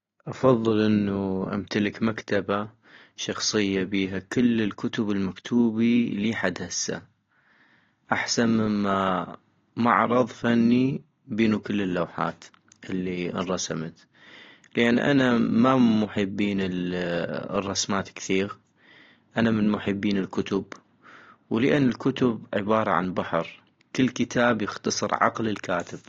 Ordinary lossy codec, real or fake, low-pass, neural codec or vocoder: AAC, 32 kbps; real; 7.2 kHz; none